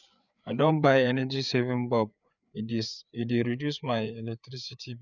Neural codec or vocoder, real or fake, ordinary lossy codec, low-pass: codec, 16 kHz, 4 kbps, FreqCodec, larger model; fake; none; 7.2 kHz